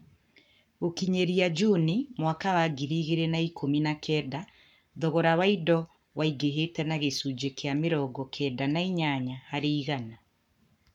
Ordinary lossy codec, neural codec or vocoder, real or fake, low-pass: none; codec, 44.1 kHz, 7.8 kbps, Pupu-Codec; fake; 19.8 kHz